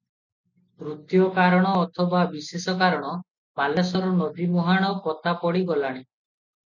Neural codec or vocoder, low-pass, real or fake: none; 7.2 kHz; real